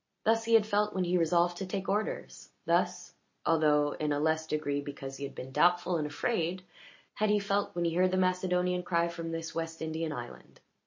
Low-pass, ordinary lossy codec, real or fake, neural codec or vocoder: 7.2 kHz; MP3, 32 kbps; real; none